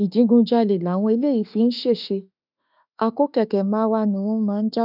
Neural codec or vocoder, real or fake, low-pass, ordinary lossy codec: autoencoder, 48 kHz, 32 numbers a frame, DAC-VAE, trained on Japanese speech; fake; 5.4 kHz; none